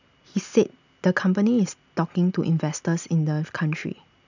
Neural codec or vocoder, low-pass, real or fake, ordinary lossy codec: none; 7.2 kHz; real; none